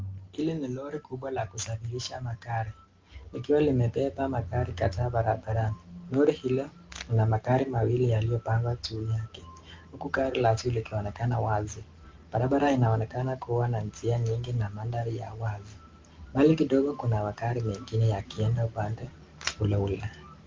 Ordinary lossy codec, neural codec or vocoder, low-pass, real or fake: Opus, 32 kbps; none; 7.2 kHz; real